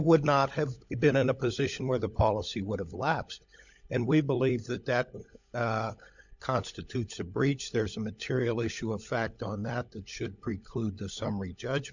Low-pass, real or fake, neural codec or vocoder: 7.2 kHz; fake; codec, 16 kHz, 16 kbps, FunCodec, trained on LibriTTS, 50 frames a second